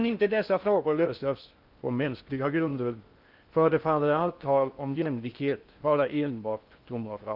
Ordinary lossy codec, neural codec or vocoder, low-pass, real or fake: Opus, 24 kbps; codec, 16 kHz in and 24 kHz out, 0.6 kbps, FocalCodec, streaming, 4096 codes; 5.4 kHz; fake